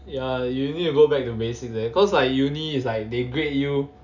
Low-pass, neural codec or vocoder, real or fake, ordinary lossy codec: 7.2 kHz; none; real; none